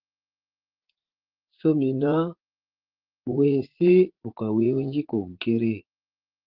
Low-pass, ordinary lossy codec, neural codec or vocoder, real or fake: 5.4 kHz; Opus, 16 kbps; vocoder, 44.1 kHz, 80 mel bands, Vocos; fake